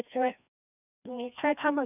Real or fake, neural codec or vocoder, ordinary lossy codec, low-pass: fake; codec, 16 kHz, 1 kbps, FreqCodec, larger model; none; 3.6 kHz